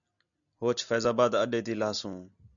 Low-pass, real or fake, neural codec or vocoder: 7.2 kHz; real; none